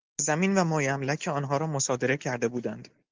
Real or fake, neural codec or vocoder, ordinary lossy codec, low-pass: real; none; Opus, 32 kbps; 7.2 kHz